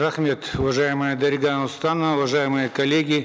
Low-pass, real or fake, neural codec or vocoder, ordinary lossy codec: none; real; none; none